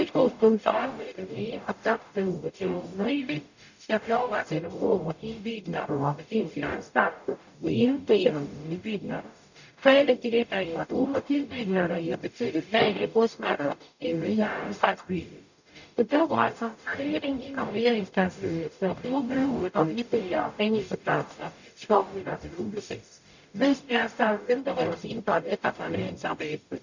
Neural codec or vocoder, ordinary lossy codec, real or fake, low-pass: codec, 44.1 kHz, 0.9 kbps, DAC; none; fake; 7.2 kHz